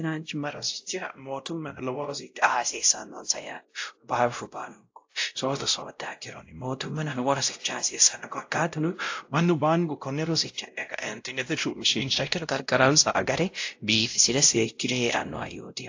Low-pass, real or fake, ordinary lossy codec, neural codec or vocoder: 7.2 kHz; fake; AAC, 48 kbps; codec, 16 kHz, 0.5 kbps, X-Codec, WavLM features, trained on Multilingual LibriSpeech